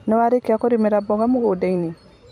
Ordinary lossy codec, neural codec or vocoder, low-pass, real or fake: MP3, 64 kbps; vocoder, 44.1 kHz, 128 mel bands every 512 samples, BigVGAN v2; 19.8 kHz; fake